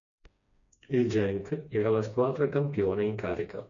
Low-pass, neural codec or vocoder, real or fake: 7.2 kHz; codec, 16 kHz, 2 kbps, FreqCodec, smaller model; fake